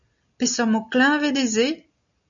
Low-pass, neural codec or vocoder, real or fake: 7.2 kHz; none; real